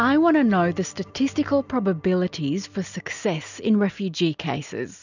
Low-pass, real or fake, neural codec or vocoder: 7.2 kHz; real; none